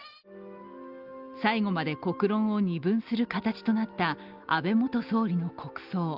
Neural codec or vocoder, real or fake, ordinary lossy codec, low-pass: none; real; Opus, 32 kbps; 5.4 kHz